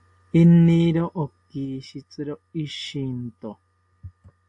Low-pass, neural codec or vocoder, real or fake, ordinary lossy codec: 10.8 kHz; none; real; AAC, 48 kbps